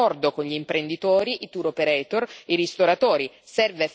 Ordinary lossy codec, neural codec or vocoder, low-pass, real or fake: none; none; none; real